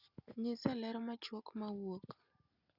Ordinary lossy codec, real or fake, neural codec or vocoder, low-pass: Opus, 64 kbps; real; none; 5.4 kHz